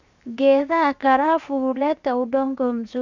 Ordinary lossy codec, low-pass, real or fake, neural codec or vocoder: none; 7.2 kHz; fake; codec, 16 kHz, 0.7 kbps, FocalCodec